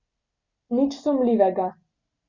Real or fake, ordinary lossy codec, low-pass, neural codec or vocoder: real; Opus, 64 kbps; 7.2 kHz; none